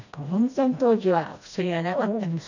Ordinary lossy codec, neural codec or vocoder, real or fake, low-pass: none; codec, 16 kHz, 1 kbps, FreqCodec, smaller model; fake; 7.2 kHz